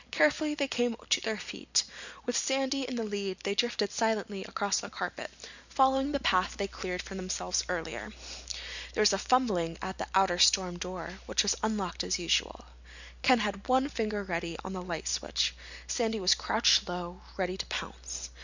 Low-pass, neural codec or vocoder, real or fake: 7.2 kHz; none; real